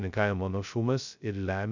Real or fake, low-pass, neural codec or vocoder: fake; 7.2 kHz; codec, 16 kHz, 0.2 kbps, FocalCodec